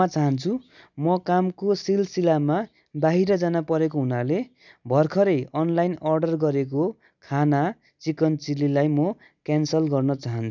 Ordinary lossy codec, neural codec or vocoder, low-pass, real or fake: none; none; 7.2 kHz; real